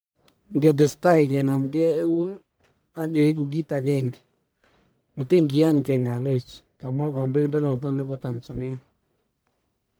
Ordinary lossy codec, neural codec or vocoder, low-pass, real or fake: none; codec, 44.1 kHz, 1.7 kbps, Pupu-Codec; none; fake